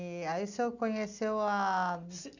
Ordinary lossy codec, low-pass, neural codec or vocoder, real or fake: none; 7.2 kHz; none; real